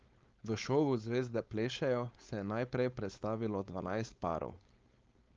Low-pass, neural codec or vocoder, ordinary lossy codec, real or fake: 7.2 kHz; codec, 16 kHz, 4.8 kbps, FACodec; Opus, 24 kbps; fake